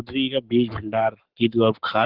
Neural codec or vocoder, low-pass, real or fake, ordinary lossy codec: codec, 44.1 kHz, 7.8 kbps, Pupu-Codec; 5.4 kHz; fake; Opus, 16 kbps